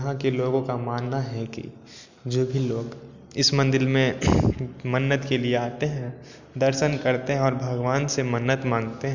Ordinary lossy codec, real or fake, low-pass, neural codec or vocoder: Opus, 64 kbps; real; 7.2 kHz; none